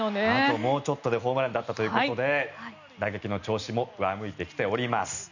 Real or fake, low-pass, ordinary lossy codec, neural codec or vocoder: real; 7.2 kHz; none; none